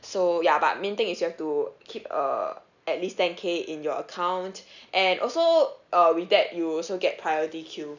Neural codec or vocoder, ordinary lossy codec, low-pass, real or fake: none; none; 7.2 kHz; real